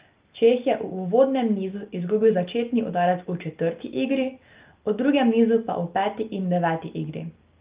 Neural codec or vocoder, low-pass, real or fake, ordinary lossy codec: none; 3.6 kHz; real; Opus, 32 kbps